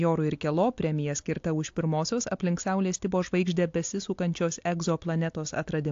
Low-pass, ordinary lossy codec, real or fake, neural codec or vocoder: 7.2 kHz; AAC, 48 kbps; real; none